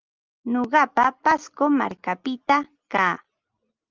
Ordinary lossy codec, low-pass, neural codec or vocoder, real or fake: Opus, 24 kbps; 7.2 kHz; none; real